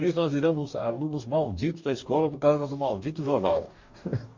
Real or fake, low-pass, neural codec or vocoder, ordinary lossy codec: fake; 7.2 kHz; codec, 44.1 kHz, 2.6 kbps, DAC; MP3, 48 kbps